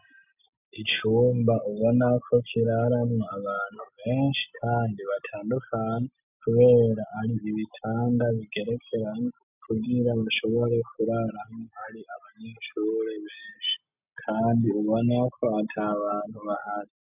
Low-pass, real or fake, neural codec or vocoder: 3.6 kHz; real; none